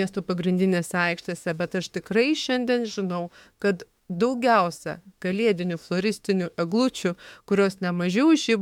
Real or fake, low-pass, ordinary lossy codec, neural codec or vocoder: fake; 19.8 kHz; MP3, 96 kbps; autoencoder, 48 kHz, 32 numbers a frame, DAC-VAE, trained on Japanese speech